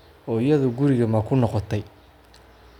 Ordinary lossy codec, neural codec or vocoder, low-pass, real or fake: none; none; 19.8 kHz; real